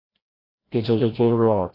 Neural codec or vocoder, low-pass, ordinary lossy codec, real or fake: codec, 16 kHz, 0.5 kbps, FreqCodec, larger model; 5.4 kHz; AAC, 24 kbps; fake